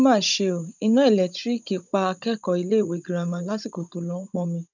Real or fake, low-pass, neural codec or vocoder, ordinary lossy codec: fake; 7.2 kHz; codec, 16 kHz, 16 kbps, FunCodec, trained on Chinese and English, 50 frames a second; none